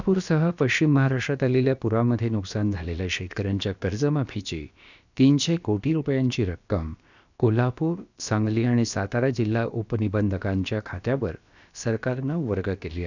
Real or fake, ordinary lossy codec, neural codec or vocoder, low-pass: fake; none; codec, 16 kHz, about 1 kbps, DyCAST, with the encoder's durations; 7.2 kHz